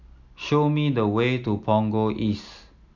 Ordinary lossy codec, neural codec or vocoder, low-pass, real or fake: none; none; 7.2 kHz; real